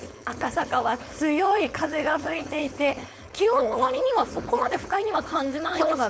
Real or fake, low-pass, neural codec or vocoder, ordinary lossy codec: fake; none; codec, 16 kHz, 4.8 kbps, FACodec; none